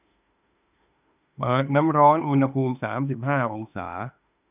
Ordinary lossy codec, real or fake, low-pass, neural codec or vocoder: none; fake; 3.6 kHz; autoencoder, 48 kHz, 32 numbers a frame, DAC-VAE, trained on Japanese speech